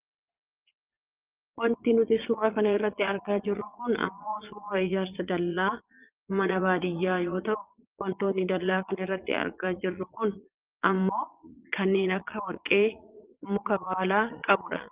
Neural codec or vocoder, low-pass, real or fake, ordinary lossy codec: vocoder, 22.05 kHz, 80 mel bands, Vocos; 3.6 kHz; fake; Opus, 32 kbps